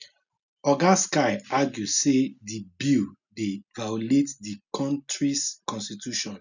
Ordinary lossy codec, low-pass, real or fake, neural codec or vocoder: none; 7.2 kHz; real; none